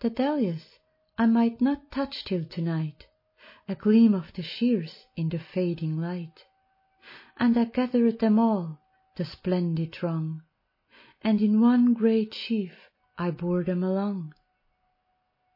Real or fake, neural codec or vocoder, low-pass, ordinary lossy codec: real; none; 5.4 kHz; MP3, 24 kbps